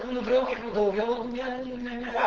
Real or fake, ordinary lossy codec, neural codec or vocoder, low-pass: fake; Opus, 16 kbps; codec, 16 kHz, 4.8 kbps, FACodec; 7.2 kHz